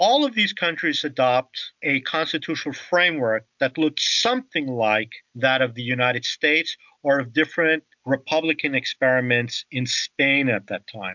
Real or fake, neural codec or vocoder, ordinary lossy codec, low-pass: real; none; MP3, 64 kbps; 7.2 kHz